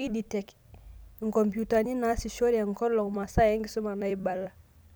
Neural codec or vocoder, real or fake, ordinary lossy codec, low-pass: vocoder, 44.1 kHz, 128 mel bands every 256 samples, BigVGAN v2; fake; none; none